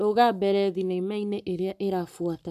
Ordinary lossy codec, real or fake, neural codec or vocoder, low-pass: none; fake; codec, 44.1 kHz, 7.8 kbps, Pupu-Codec; 14.4 kHz